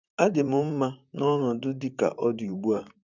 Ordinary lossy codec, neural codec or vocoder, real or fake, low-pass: none; vocoder, 44.1 kHz, 128 mel bands, Pupu-Vocoder; fake; 7.2 kHz